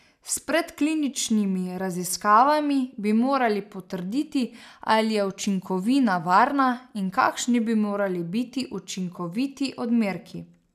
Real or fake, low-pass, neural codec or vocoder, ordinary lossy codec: real; 14.4 kHz; none; none